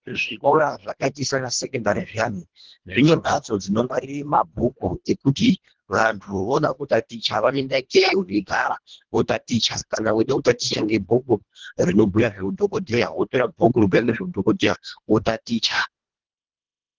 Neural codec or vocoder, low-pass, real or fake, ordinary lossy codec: codec, 24 kHz, 1.5 kbps, HILCodec; 7.2 kHz; fake; Opus, 16 kbps